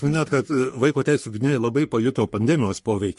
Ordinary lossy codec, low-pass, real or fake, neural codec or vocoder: MP3, 48 kbps; 14.4 kHz; fake; codec, 44.1 kHz, 3.4 kbps, Pupu-Codec